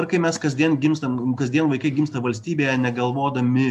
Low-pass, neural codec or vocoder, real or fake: 14.4 kHz; none; real